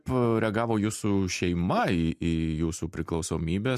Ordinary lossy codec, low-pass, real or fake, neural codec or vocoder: MP3, 64 kbps; 14.4 kHz; real; none